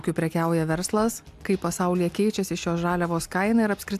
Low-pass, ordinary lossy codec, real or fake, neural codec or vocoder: 14.4 kHz; AAC, 96 kbps; real; none